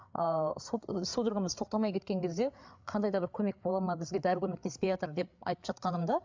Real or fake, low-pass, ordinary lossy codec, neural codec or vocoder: fake; 7.2 kHz; MP3, 64 kbps; codec, 16 kHz, 8 kbps, FreqCodec, larger model